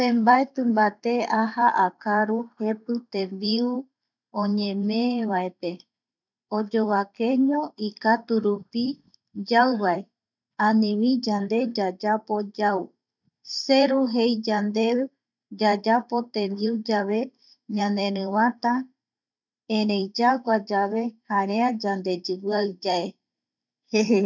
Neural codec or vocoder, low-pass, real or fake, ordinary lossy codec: vocoder, 44.1 kHz, 128 mel bands every 512 samples, BigVGAN v2; 7.2 kHz; fake; none